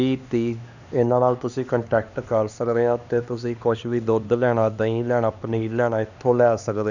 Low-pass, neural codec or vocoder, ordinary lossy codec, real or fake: 7.2 kHz; codec, 16 kHz, 2 kbps, X-Codec, HuBERT features, trained on LibriSpeech; none; fake